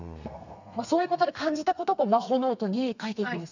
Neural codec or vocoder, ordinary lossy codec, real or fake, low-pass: codec, 32 kHz, 1.9 kbps, SNAC; none; fake; 7.2 kHz